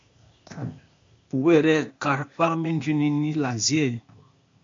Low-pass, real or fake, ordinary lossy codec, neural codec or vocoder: 7.2 kHz; fake; MP3, 48 kbps; codec, 16 kHz, 0.8 kbps, ZipCodec